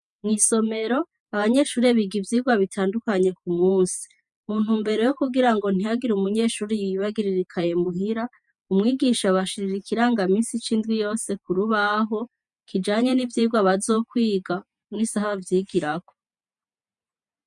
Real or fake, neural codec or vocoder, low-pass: fake; vocoder, 48 kHz, 128 mel bands, Vocos; 10.8 kHz